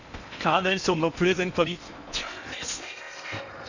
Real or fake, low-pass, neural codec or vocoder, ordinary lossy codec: fake; 7.2 kHz; codec, 16 kHz in and 24 kHz out, 0.8 kbps, FocalCodec, streaming, 65536 codes; none